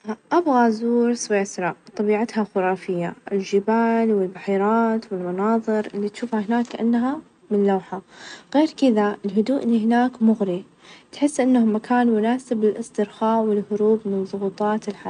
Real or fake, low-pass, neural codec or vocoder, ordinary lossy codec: real; 9.9 kHz; none; none